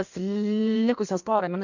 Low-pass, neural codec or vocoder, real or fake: 7.2 kHz; codec, 16 kHz in and 24 kHz out, 1.1 kbps, FireRedTTS-2 codec; fake